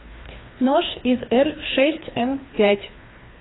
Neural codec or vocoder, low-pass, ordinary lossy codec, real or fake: codec, 16 kHz, 2 kbps, FreqCodec, larger model; 7.2 kHz; AAC, 16 kbps; fake